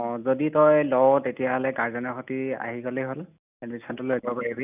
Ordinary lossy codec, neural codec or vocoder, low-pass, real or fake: none; none; 3.6 kHz; real